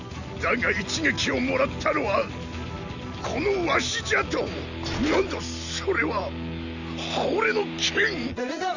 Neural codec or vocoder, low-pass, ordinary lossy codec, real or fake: none; 7.2 kHz; none; real